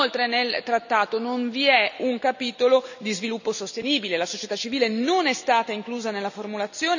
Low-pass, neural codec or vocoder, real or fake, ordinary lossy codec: 7.2 kHz; none; real; none